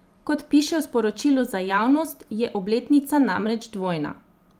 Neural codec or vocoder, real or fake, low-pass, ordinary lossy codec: vocoder, 44.1 kHz, 128 mel bands every 512 samples, BigVGAN v2; fake; 19.8 kHz; Opus, 32 kbps